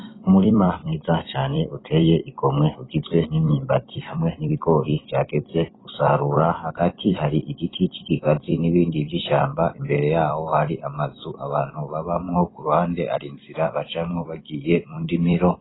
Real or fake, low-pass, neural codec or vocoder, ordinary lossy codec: fake; 7.2 kHz; vocoder, 44.1 kHz, 128 mel bands every 256 samples, BigVGAN v2; AAC, 16 kbps